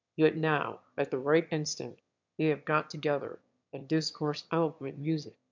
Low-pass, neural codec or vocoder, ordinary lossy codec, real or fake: 7.2 kHz; autoencoder, 22.05 kHz, a latent of 192 numbers a frame, VITS, trained on one speaker; MP3, 64 kbps; fake